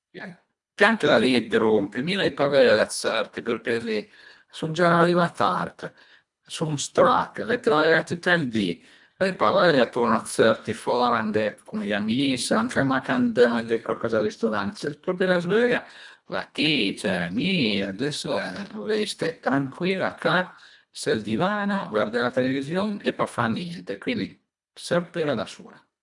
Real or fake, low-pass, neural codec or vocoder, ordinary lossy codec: fake; 10.8 kHz; codec, 24 kHz, 1.5 kbps, HILCodec; none